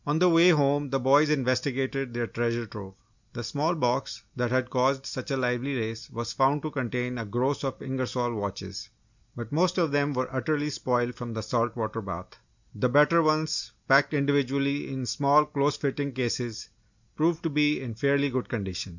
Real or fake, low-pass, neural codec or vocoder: real; 7.2 kHz; none